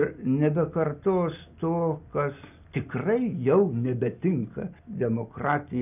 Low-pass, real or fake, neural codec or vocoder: 3.6 kHz; real; none